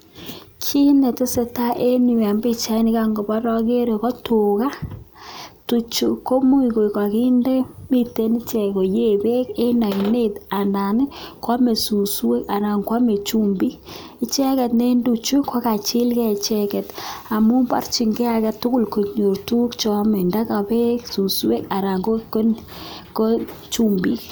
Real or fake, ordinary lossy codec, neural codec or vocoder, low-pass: real; none; none; none